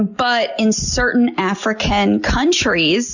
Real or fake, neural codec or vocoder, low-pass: real; none; 7.2 kHz